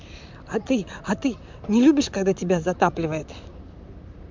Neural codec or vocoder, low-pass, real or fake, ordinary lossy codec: vocoder, 44.1 kHz, 128 mel bands, Pupu-Vocoder; 7.2 kHz; fake; MP3, 64 kbps